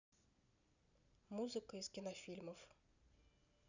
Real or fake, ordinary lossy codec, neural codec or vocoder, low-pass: real; none; none; 7.2 kHz